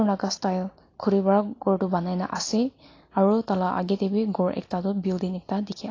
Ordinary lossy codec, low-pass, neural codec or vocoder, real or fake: AAC, 32 kbps; 7.2 kHz; none; real